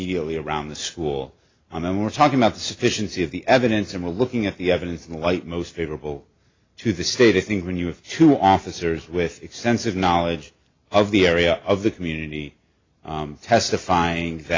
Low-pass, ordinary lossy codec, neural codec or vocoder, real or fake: 7.2 kHz; AAC, 32 kbps; none; real